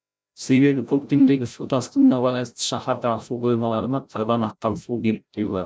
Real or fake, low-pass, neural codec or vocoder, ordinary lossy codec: fake; none; codec, 16 kHz, 0.5 kbps, FreqCodec, larger model; none